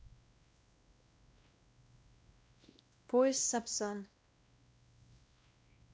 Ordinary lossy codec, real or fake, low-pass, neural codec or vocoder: none; fake; none; codec, 16 kHz, 1 kbps, X-Codec, WavLM features, trained on Multilingual LibriSpeech